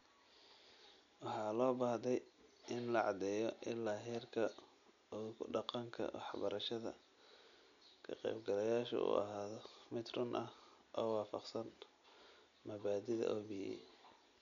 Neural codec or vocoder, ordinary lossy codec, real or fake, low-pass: none; none; real; 7.2 kHz